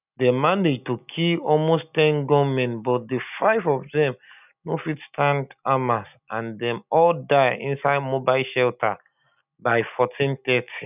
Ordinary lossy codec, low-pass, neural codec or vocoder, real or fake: none; 3.6 kHz; none; real